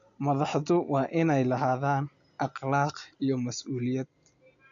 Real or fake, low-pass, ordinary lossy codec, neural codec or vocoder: real; 7.2 kHz; none; none